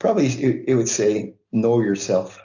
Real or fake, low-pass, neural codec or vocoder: real; 7.2 kHz; none